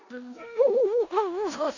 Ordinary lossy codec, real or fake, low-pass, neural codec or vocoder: none; fake; 7.2 kHz; codec, 16 kHz in and 24 kHz out, 0.9 kbps, LongCat-Audio-Codec, four codebook decoder